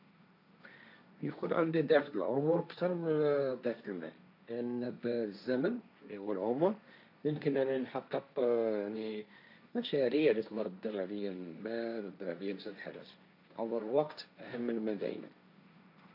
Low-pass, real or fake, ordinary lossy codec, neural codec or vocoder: 5.4 kHz; fake; none; codec, 16 kHz, 1.1 kbps, Voila-Tokenizer